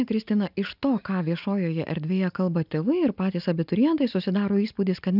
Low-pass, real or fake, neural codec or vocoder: 5.4 kHz; real; none